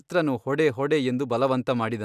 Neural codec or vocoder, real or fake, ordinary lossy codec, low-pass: none; real; none; 14.4 kHz